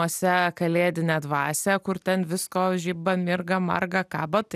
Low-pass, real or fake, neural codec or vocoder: 14.4 kHz; real; none